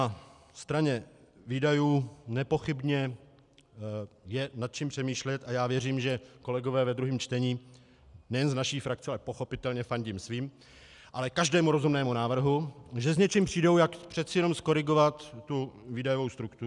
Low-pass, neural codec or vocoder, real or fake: 10.8 kHz; none; real